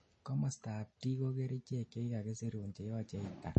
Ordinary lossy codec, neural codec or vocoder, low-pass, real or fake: MP3, 32 kbps; none; 10.8 kHz; real